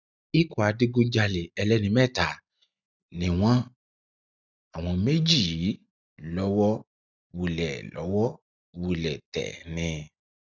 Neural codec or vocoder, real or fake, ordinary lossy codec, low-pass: none; real; none; 7.2 kHz